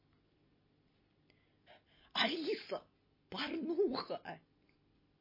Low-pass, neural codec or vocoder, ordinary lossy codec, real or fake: 5.4 kHz; none; MP3, 24 kbps; real